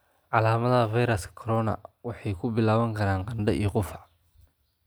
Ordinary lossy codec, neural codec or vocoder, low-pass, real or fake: none; none; none; real